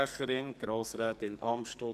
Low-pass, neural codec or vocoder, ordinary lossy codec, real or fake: 14.4 kHz; codec, 44.1 kHz, 2.6 kbps, SNAC; none; fake